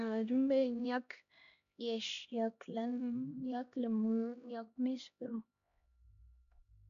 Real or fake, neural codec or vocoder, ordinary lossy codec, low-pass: fake; codec, 16 kHz, 1 kbps, X-Codec, HuBERT features, trained on LibriSpeech; none; 7.2 kHz